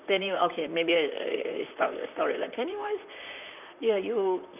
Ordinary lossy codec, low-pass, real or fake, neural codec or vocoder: none; 3.6 kHz; fake; vocoder, 44.1 kHz, 128 mel bands, Pupu-Vocoder